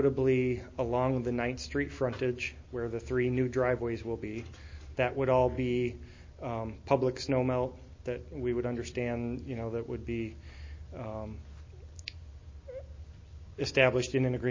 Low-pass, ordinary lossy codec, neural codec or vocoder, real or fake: 7.2 kHz; MP3, 32 kbps; none; real